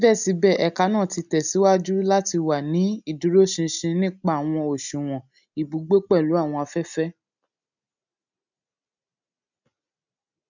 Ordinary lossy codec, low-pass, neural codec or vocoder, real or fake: none; 7.2 kHz; none; real